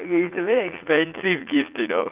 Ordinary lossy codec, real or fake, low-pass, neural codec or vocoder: Opus, 24 kbps; fake; 3.6 kHz; vocoder, 44.1 kHz, 80 mel bands, Vocos